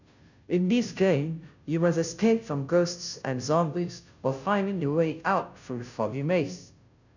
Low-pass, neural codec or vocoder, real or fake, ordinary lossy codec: 7.2 kHz; codec, 16 kHz, 0.5 kbps, FunCodec, trained on Chinese and English, 25 frames a second; fake; none